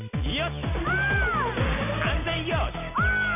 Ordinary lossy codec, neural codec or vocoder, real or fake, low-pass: none; none; real; 3.6 kHz